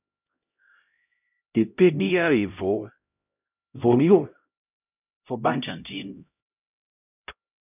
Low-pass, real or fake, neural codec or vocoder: 3.6 kHz; fake; codec, 16 kHz, 0.5 kbps, X-Codec, HuBERT features, trained on LibriSpeech